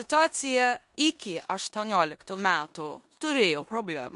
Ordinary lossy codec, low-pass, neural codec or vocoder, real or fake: MP3, 64 kbps; 10.8 kHz; codec, 16 kHz in and 24 kHz out, 0.9 kbps, LongCat-Audio-Codec, four codebook decoder; fake